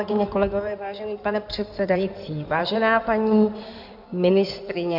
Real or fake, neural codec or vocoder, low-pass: fake; codec, 16 kHz in and 24 kHz out, 2.2 kbps, FireRedTTS-2 codec; 5.4 kHz